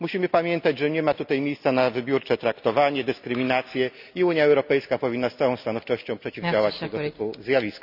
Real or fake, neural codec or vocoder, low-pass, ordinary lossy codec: real; none; 5.4 kHz; none